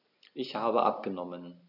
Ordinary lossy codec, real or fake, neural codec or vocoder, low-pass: none; real; none; 5.4 kHz